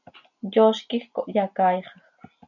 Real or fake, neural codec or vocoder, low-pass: real; none; 7.2 kHz